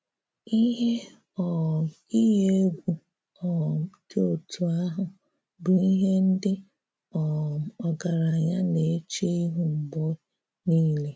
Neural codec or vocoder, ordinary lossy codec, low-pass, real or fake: none; none; none; real